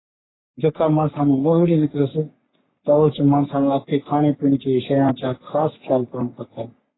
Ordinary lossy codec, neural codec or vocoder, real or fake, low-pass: AAC, 16 kbps; codec, 44.1 kHz, 3.4 kbps, Pupu-Codec; fake; 7.2 kHz